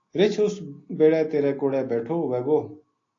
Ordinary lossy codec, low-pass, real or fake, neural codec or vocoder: AAC, 32 kbps; 7.2 kHz; real; none